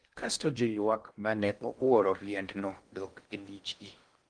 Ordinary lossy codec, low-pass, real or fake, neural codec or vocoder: Opus, 24 kbps; 9.9 kHz; fake; codec, 16 kHz in and 24 kHz out, 0.6 kbps, FocalCodec, streaming, 2048 codes